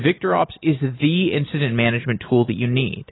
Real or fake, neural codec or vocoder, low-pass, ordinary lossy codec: real; none; 7.2 kHz; AAC, 16 kbps